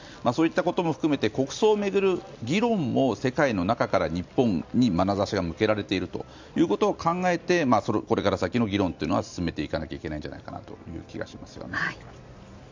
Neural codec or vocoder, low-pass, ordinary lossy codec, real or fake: none; 7.2 kHz; MP3, 64 kbps; real